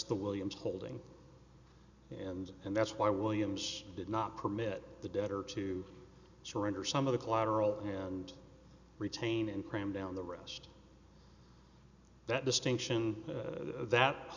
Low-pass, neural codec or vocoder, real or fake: 7.2 kHz; none; real